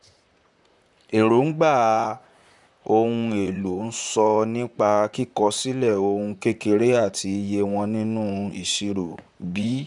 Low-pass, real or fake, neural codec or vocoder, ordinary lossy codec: 10.8 kHz; fake; vocoder, 44.1 kHz, 128 mel bands, Pupu-Vocoder; none